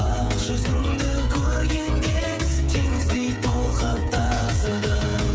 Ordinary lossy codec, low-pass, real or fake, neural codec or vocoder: none; none; fake; codec, 16 kHz, 16 kbps, FreqCodec, smaller model